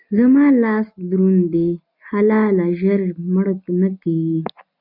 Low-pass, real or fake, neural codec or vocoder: 5.4 kHz; real; none